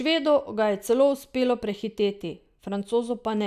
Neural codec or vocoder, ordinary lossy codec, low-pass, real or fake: none; none; 14.4 kHz; real